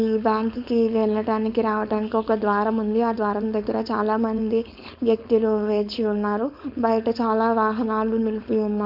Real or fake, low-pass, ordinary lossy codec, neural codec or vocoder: fake; 5.4 kHz; none; codec, 16 kHz, 4.8 kbps, FACodec